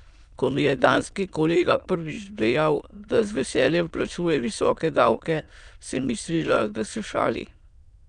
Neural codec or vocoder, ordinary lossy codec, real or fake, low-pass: autoencoder, 22.05 kHz, a latent of 192 numbers a frame, VITS, trained on many speakers; none; fake; 9.9 kHz